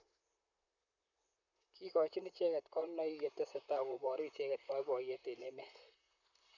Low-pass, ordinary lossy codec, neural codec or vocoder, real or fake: 7.2 kHz; none; vocoder, 44.1 kHz, 128 mel bands, Pupu-Vocoder; fake